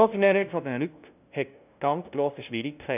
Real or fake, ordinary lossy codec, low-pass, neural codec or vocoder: fake; none; 3.6 kHz; codec, 16 kHz, 0.5 kbps, FunCodec, trained on LibriTTS, 25 frames a second